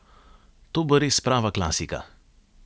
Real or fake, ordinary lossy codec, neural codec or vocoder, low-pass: real; none; none; none